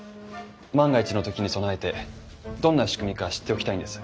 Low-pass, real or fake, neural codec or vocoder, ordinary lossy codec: none; real; none; none